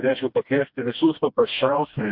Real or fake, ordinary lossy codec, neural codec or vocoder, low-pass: fake; MP3, 48 kbps; codec, 16 kHz, 1 kbps, FreqCodec, smaller model; 5.4 kHz